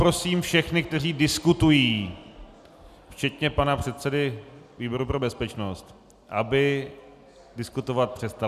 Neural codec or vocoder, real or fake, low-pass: none; real; 14.4 kHz